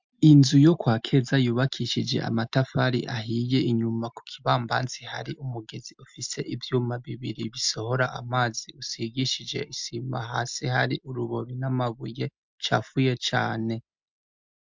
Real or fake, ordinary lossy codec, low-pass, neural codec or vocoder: real; MP3, 64 kbps; 7.2 kHz; none